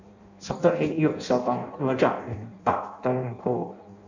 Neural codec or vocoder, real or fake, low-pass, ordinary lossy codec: codec, 16 kHz in and 24 kHz out, 0.6 kbps, FireRedTTS-2 codec; fake; 7.2 kHz; none